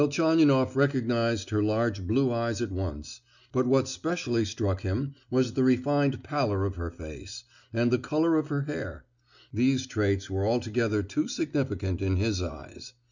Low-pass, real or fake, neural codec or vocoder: 7.2 kHz; real; none